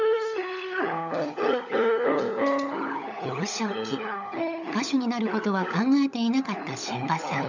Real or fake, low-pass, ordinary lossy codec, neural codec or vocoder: fake; 7.2 kHz; none; codec, 16 kHz, 16 kbps, FunCodec, trained on LibriTTS, 50 frames a second